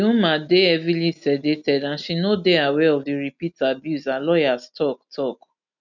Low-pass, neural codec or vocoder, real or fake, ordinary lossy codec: 7.2 kHz; none; real; none